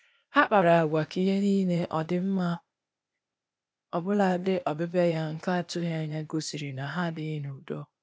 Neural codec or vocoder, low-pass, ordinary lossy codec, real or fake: codec, 16 kHz, 0.8 kbps, ZipCodec; none; none; fake